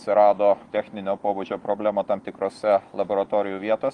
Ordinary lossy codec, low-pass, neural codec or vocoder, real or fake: Opus, 24 kbps; 10.8 kHz; none; real